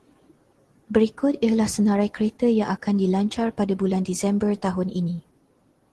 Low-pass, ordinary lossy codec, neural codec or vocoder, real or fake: 10.8 kHz; Opus, 16 kbps; none; real